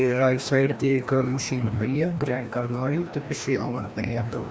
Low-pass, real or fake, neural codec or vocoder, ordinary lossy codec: none; fake; codec, 16 kHz, 1 kbps, FreqCodec, larger model; none